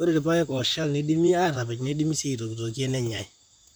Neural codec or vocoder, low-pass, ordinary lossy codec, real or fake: vocoder, 44.1 kHz, 128 mel bands, Pupu-Vocoder; none; none; fake